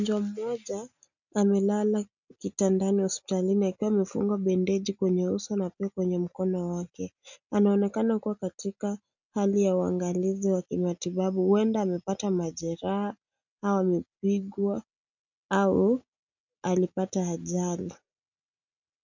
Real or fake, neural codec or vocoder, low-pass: real; none; 7.2 kHz